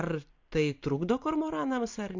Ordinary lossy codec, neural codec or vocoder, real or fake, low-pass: MP3, 48 kbps; none; real; 7.2 kHz